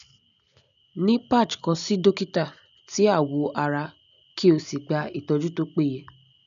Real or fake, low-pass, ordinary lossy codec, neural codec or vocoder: real; 7.2 kHz; none; none